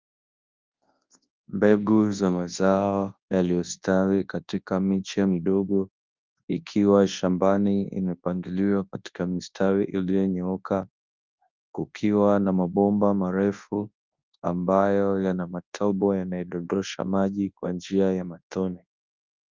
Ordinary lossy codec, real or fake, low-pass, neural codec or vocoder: Opus, 24 kbps; fake; 7.2 kHz; codec, 24 kHz, 0.9 kbps, WavTokenizer, large speech release